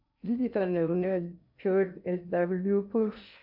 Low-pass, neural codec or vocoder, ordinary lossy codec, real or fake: 5.4 kHz; codec, 16 kHz in and 24 kHz out, 0.6 kbps, FocalCodec, streaming, 2048 codes; MP3, 48 kbps; fake